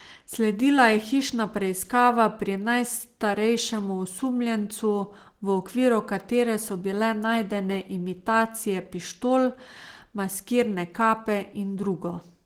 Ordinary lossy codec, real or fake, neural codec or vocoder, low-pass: Opus, 16 kbps; real; none; 19.8 kHz